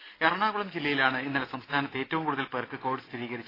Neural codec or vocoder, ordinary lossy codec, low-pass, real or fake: none; AAC, 24 kbps; 5.4 kHz; real